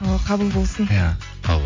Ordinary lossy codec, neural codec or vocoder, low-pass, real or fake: MP3, 48 kbps; none; 7.2 kHz; real